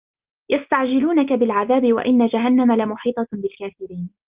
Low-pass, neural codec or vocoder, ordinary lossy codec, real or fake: 3.6 kHz; none; Opus, 24 kbps; real